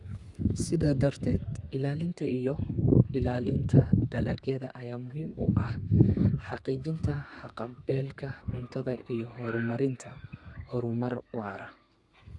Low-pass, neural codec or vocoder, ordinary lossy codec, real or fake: 10.8 kHz; codec, 32 kHz, 1.9 kbps, SNAC; Opus, 64 kbps; fake